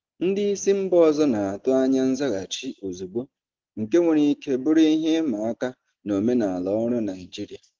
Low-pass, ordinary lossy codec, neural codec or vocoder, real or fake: 7.2 kHz; Opus, 16 kbps; none; real